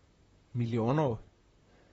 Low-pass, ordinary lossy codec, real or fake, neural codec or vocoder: 19.8 kHz; AAC, 24 kbps; fake; vocoder, 44.1 kHz, 128 mel bands, Pupu-Vocoder